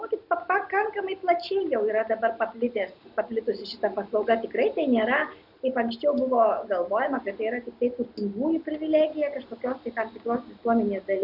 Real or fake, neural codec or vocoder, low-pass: real; none; 5.4 kHz